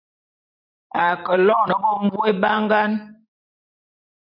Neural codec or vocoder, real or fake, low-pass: vocoder, 44.1 kHz, 128 mel bands every 512 samples, BigVGAN v2; fake; 5.4 kHz